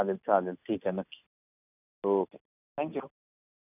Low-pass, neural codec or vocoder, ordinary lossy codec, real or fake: 3.6 kHz; none; none; real